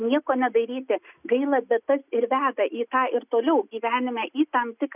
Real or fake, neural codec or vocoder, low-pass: real; none; 3.6 kHz